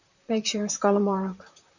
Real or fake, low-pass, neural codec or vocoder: fake; 7.2 kHz; vocoder, 44.1 kHz, 128 mel bands, Pupu-Vocoder